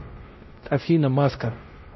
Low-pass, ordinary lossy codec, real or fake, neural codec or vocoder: 7.2 kHz; MP3, 24 kbps; fake; codec, 16 kHz, 0.5 kbps, X-Codec, WavLM features, trained on Multilingual LibriSpeech